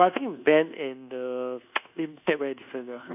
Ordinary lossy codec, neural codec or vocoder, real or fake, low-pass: none; codec, 24 kHz, 1.2 kbps, DualCodec; fake; 3.6 kHz